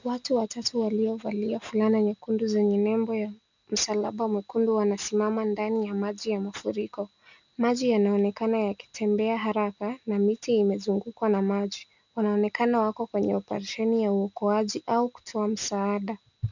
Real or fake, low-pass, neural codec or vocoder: real; 7.2 kHz; none